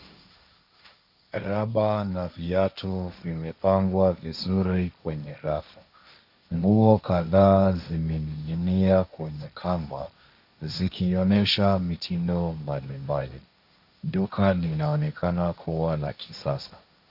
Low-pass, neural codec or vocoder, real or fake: 5.4 kHz; codec, 16 kHz, 1.1 kbps, Voila-Tokenizer; fake